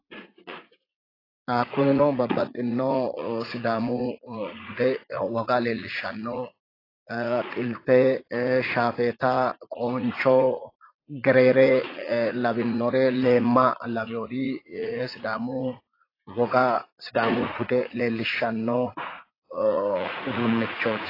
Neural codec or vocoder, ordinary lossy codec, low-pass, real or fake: vocoder, 44.1 kHz, 80 mel bands, Vocos; AAC, 24 kbps; 5.4 kHz; fake